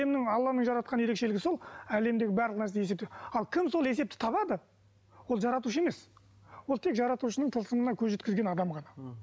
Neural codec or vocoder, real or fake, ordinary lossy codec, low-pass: none; real; none; none